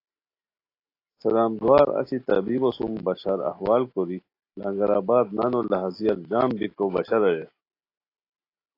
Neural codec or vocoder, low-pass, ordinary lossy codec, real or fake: none; 5.4 kHz; AAC, 32 kbps; real